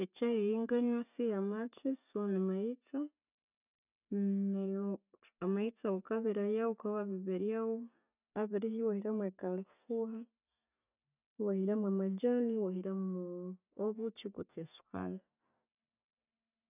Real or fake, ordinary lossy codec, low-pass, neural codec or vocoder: real; none; 3.6 kHz; none